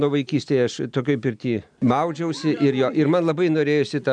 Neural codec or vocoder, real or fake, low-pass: none; real; 9.9 kHz